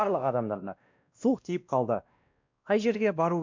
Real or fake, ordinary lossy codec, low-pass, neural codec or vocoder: fake; MP3, 64 kbps; 7.2 kHz; codec, 16 kHz, 1 kbps, X-Codec, WavLM features, trained on Multilingual LibriSpeech